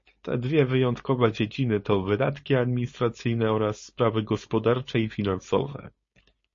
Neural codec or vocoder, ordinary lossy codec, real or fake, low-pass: codec, 16 kHz, 4.8 kbps, FACodec; MP3, 32 kbps; fake; 7.2 kHz